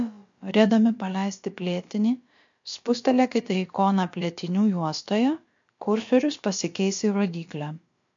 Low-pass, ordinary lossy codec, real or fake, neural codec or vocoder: 7.2 kHz; MP3, 48 kbps; fake; codec, 16 kHz, about 1 kbps, DyCAST, with the encoder's durations